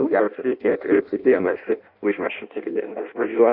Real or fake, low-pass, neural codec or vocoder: fake; 5.4 kHz; codec, 16 kHz in and 24 kHz out, 0.6 kbps, FireRedTTS-2 codec